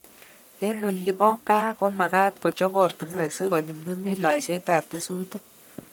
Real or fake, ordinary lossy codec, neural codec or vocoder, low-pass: fake; none; codec, 44.1 kHz, 1.7 kbps, Pupu-Codec; none